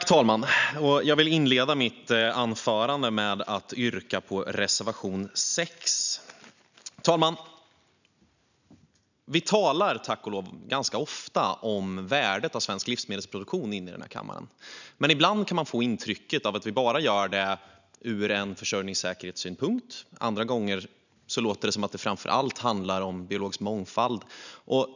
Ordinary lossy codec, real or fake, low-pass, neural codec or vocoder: none; real; 7.2 kHz; none